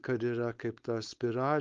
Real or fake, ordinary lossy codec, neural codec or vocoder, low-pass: fake; Opus, 32 kbps; codec, 16 kHz, 4.8 kbps, FACodec; 7.2 kHz